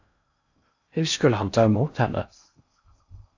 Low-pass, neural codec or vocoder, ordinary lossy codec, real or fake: 7.2 kHz; codec, 16 kHz in and 24 kHz out, 0.6 kbps, FocalCodec, streaming, 4096 codes; AAC, 48 kbps; fake